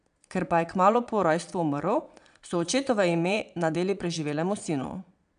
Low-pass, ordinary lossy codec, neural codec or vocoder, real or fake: 9.9 kHz; none; vocoder, 22.05 kHz, 80 mel bands, Vocos; fake